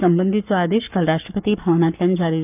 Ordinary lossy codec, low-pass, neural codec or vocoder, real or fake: none; 3.6 kHz; codec, 44.1 kHz, 7.8 kbps, Pupu-Codec; fake